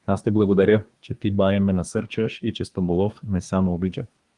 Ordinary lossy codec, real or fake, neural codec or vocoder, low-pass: Opus, 32 kbps; fake; codec, 24 kHz, 1 kbps, SNAC; 10.8 kHz